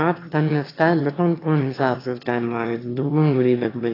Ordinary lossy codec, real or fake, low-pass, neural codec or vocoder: AAC, 24 kbps; fake; 5.4 kHz; autoencoder, 22.05 kHz, a latent of 192 numbers a frame, VITS, trained on one speaker